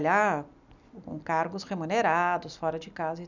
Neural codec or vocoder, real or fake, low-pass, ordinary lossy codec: none; real; 7.2 kHz; none